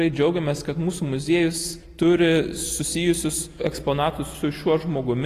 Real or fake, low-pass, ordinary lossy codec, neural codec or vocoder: real; 14.4 kHz; AAC, 48 kbps; none